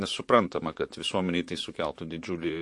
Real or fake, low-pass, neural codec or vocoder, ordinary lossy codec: fake; 10.8 kHz; vocoder, 44.1 kHz, 128 mel bands, Pupu-Vocoder; MP3, 48 kbps